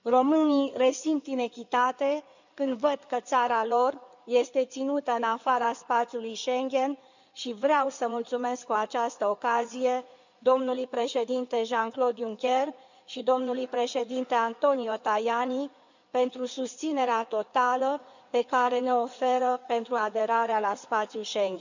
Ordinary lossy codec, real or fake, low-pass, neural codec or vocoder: none; fake; 7.2 kHz; codec, 16 kHz in and 24 kHz out, 2.2 kbps, FireRedTTS-2 codec